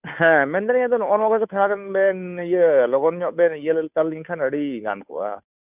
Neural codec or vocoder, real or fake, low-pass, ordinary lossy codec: codec, 16 kHz, 2 kbps, FunCodec, trained on Chinese and English, 25 frames a second; fake; 3.6 kHz; none